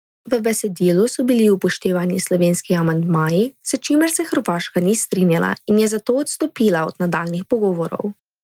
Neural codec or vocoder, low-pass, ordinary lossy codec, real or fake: none; 19.8 kHz; Opus, 32 kbps; real